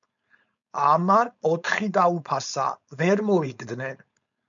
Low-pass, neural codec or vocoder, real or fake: 7.2 kHz; codec, 16 kHz, 4.8 kbps, FACodec; fake